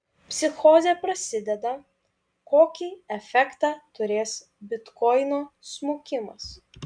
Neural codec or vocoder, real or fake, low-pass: none; real; 9.9 kHz